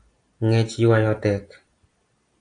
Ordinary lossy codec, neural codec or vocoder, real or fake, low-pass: AAC, 48 kbps; none; real; 9.9 kHz